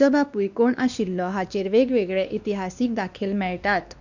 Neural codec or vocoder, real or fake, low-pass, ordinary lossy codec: codec, 16 kHz, 2 kbps, X-Codec, WavLM features, trained on Multilingual LibriSpeech; fake; 7.2 kHz; none